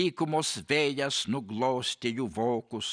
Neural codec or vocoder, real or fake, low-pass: none; real; 9.9 kHz